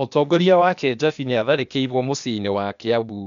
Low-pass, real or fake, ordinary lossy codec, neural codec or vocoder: 7.2 kHz; fake; none; codec, 16 kHz, 0.8 kbps, ZipCodec